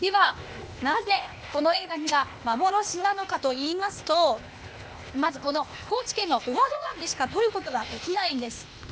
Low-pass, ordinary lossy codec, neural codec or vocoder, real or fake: none; none; codec, 16 kHz, 0.8 kbps, ZipCodec; fake